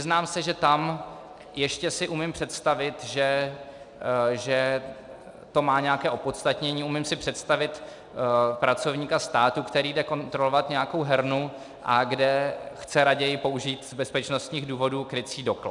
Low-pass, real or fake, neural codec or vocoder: 10.8 kHz; real; none